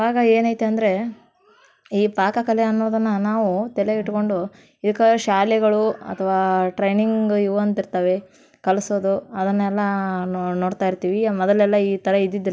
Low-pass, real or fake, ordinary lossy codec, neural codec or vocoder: none; real; none; none